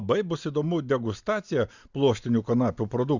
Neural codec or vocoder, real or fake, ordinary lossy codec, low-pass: none; real; Opus, 64 kbps; 7.2 kHz